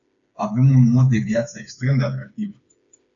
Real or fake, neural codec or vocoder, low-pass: fake; codec, 16 kHz, 4 kbps, FreqCodec, smaller model; 7.2 kHz